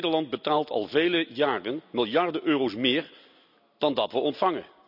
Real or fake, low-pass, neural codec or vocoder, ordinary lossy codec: real; 5.4 kHz; none; none